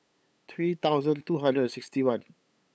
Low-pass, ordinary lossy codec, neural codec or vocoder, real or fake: none; none; codec, 16 kHz, 8 kbps, FunCodec, trained on LibriTTS, 25 frames a second; fake